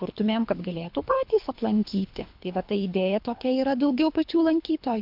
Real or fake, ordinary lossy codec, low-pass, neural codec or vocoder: fake; MP3, 48 kbps; 5.4 kHz; codec, 24 kHz, 3 kbps, HILCodec